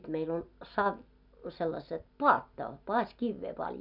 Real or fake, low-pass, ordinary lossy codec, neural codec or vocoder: real; 5.4 kHz; none; none